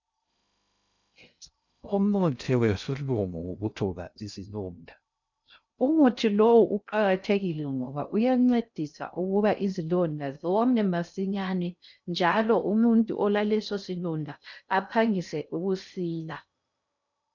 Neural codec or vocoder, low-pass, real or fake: codec, 16 kHz in and 24 kHz out, 0.6 kbps, FocalCodec, streaming, 2048 codes; 7.2 kHz; fake